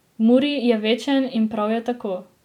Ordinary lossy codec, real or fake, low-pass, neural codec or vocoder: none; real; 19.8 kHz; none